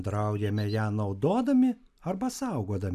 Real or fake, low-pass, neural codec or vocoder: real; 14.4 kHz; none